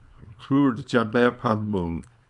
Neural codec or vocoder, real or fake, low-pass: codec, 24 kHz, 0.9 kbps, WavTokenizer, small release; fake; 10.8 kHz